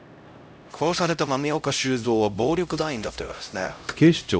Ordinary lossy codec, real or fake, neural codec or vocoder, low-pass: none; fake; codec, 16 kHz, 0.5 kbps, X-Codec, HuBERT features, trained on LibriSpeech; none